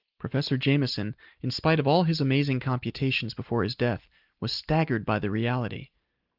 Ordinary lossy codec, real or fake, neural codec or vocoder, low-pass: Opus, 32 kbps; real; none; 5.4 kHz